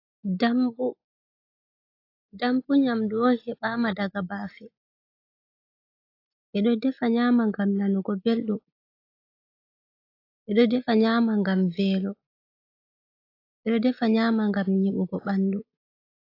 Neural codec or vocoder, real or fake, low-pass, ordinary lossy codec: none; real; 5.4 kHz; AAC, 32 kbps